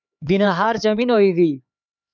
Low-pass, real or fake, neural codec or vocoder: 7.2 kHz; fake; codec, 16 kHz, 4 kbps, X-Codec, HuBERT features, trained on LibriSpeech